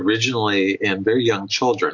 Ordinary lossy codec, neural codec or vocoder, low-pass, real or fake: MP3, 48 kbps; none; 7.2 kHz; real